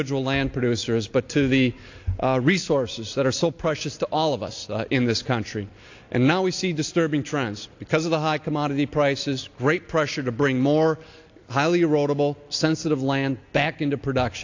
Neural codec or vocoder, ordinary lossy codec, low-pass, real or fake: none; AAC, 48 kbps; 7.2 kHz; real